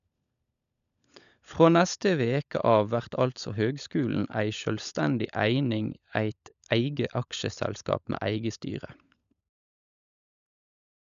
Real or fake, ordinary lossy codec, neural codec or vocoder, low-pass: fake; MP3, 96 kbps; codec, 16 kHz, 16 kbps, FunCodec, trained on LibriTTS, 50 frames a second; 7.2 kHz